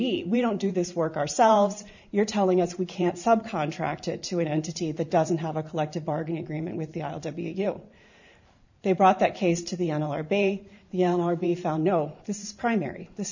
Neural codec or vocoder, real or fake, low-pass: vocoder, 44.1 kHz, 128 mel bands every 512 samples, BigVGAN v2; fake; 7.2 kHz